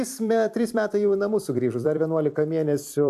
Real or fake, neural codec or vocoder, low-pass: fake; vocoder, 44.1 kHz, 128 mel bands every 256 samples, BigVGAN v2; 14.4 kHz